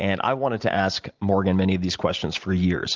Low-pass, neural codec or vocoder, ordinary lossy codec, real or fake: 7.2 kHz; none; Opus, 24 kbps; real